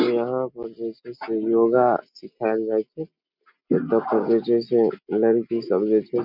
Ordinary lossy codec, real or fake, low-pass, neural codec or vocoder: none; real; 5.4 kHz; none